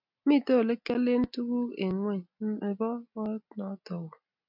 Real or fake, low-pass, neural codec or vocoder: real; 5.4 kHz; none